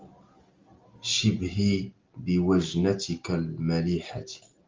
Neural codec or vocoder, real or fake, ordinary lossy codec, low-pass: none; real; Opus, 32 kbps; 7.2 kHz